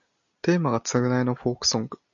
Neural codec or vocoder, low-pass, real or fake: none; 7.2 kHz; real